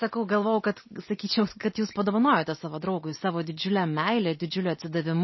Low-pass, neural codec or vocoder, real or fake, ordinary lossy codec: 7.2 kHz; none; real; MP3, 24 kbps